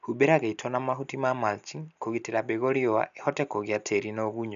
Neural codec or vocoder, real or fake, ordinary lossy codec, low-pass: none; real; none; 7.2 kHz